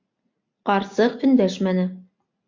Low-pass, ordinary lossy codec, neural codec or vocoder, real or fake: 7.2 kHz; MP3, 48 kbps; none; real